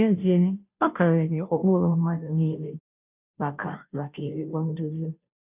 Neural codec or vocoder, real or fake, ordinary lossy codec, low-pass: codec, 16 kHz, 0.5 kbps, FunCodec, trained on Chinese and English, 25 frames a second; fake; none; 3.6 kHz